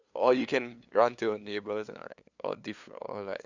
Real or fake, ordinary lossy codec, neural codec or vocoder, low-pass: fake; Opus, 64 kbps; codec, 16 kHz, 2 kbps, FunCodec, trained on LibriTTS, 25 frames a second; 7.2 kHz